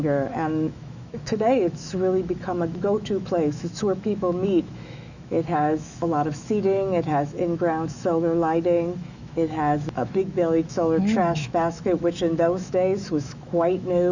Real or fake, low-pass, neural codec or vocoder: fake; 7.2 kHz; autoencoder, 48 kHz, 128 numbers a frame, DAC-VAE, trained on Japanese speech